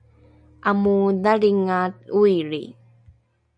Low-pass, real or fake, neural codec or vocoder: 9.9 kHz; real; none